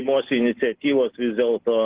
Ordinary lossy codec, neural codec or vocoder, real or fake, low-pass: Opus, 16 kbps; none; real; 3.6 kHz